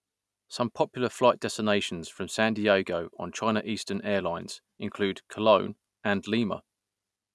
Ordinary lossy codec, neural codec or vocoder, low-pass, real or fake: none; none; none; real